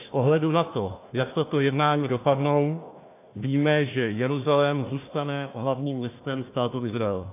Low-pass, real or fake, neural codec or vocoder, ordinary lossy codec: 3.6 kHz; fake; codec, 16 kHz, 1 kbps, FunCodec, trained on Chinese and English, 50 frames a second; AAC, 24 kbps